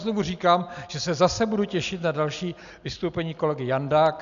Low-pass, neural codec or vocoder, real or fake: 7.2 kHz; none; real